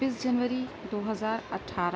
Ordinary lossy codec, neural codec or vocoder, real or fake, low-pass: none; none; real; none